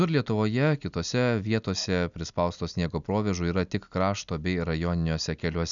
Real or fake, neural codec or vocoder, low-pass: real; none; 7.2 kHz